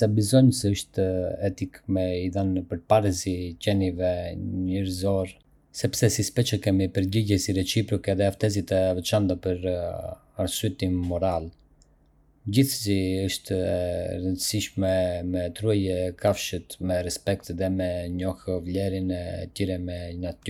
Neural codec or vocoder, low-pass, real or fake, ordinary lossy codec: none; 19.8 kHz; real; none